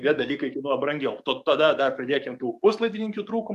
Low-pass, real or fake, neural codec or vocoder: 14.4 kHz; fake; codec, 44.1 kHz, 7.8 kbps, DAC